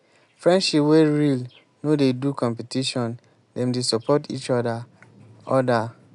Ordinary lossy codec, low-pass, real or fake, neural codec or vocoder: none; 10.8 kHz; real; none